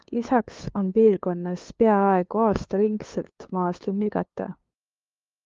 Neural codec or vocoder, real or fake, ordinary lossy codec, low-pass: codec, 16 kHz, 2 kbps, FunCodec, trained on LibriTTS, 25 frames a second; fake; Opus, 32 kbps; 7.2 kHz